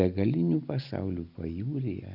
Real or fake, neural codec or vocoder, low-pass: real; none; 5.4 kHz